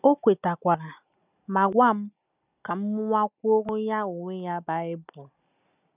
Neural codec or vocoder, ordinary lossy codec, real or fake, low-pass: none; none; real; 3.6 kHz